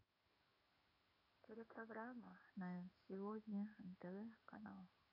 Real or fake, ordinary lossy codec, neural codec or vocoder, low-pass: fake; none; codec, 16 kHz in and 24 kHz out, 1 kbps, XY-Tokenizer; 5.4 kHz